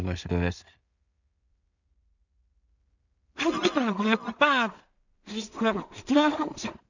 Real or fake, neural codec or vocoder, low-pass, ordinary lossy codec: fake; codec, 16 kHz in and 24 kHz out, 0.4 kbps, LongCat-Audio-Codec, two codebook decoder; 7.2 kHz; none